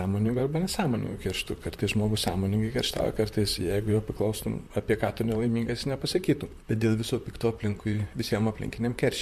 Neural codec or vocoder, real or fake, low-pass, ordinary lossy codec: vocoder, 44.1 kHz, 128 mel bands, Pupu-Vocoder; fake; 14.4 kHz; MP3, 64 kbps